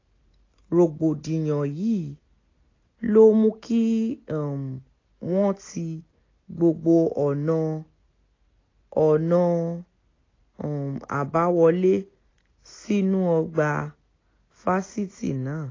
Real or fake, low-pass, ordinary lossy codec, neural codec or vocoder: real; 7.2 kHz; AAC, 32 kbps; none